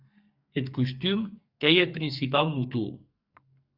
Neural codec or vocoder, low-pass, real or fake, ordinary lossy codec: codec, 44.1 kHz, 2.6 kbps, SNAC; 5.4 kHz; fake; Opus, 64 kbps